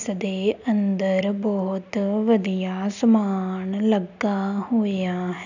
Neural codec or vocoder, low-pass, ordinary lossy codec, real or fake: none; 7.2 kHz; none; real